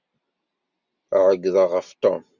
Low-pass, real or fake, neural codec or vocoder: 7.2 kHz; real; none